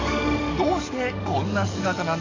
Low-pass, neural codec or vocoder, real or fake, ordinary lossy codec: 7.2 kHz; codec, 44.1 kHz, 7.8 kbps, DAC; fake; AAC, 48 kbps